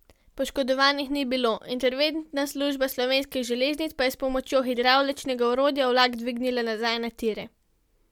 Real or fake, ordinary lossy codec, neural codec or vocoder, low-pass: real; MP3, 96 kbps; none; 19.8 kHz